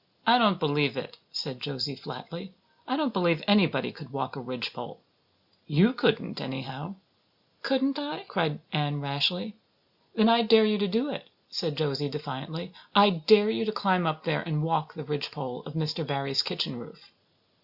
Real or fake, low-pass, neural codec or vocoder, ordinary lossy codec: real; 5.4 kHz; none; Opus, 64 kbps